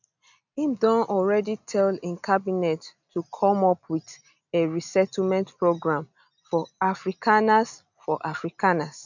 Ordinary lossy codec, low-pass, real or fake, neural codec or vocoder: none; 7.2 kHz; real; none